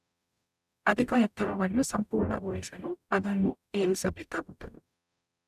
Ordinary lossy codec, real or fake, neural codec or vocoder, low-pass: none; fake; codec, 44.1 kHz, 0.9 kbps, DAC; 14.4 kHz